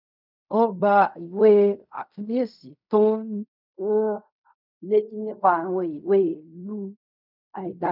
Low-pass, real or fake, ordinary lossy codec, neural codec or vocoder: 5.4 kHz; fake; none; codec, 16 kHz in and 24 kHz out, 0.4 kbps, LongCat-Audio-Codec, fine tuned four codebook decoder